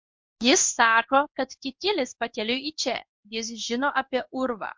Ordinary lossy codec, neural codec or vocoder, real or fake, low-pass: MP3, 48 kbps; codec, 16 kHz in and 24 kHz out, 1 kbps, XY-Tokenizer; fake; 7.2 kHz